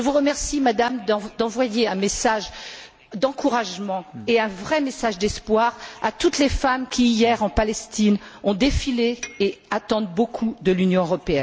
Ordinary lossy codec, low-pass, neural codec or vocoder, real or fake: none; none; none; real